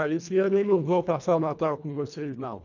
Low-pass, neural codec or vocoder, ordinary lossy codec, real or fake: 7.2 kHz; codec, 24 kHz, 1.5 kbps, HILCodec; none; fake